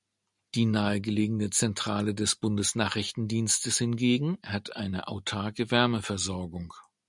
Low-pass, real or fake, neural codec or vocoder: 10.8 kHz; real; none